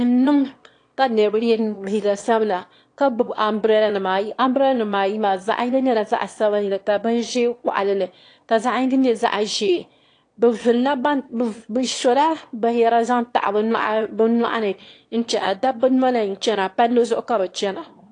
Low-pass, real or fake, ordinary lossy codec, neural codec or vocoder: 9.9 kHz; fake; AAC, 48 kbps; autoencoder, 22.05 kHz, a latent of 192 numbers a frame, VITS, trained on one speaker